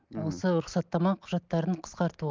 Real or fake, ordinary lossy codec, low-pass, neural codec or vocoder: real; Opus, 24 kbps; 7.2 kHz; none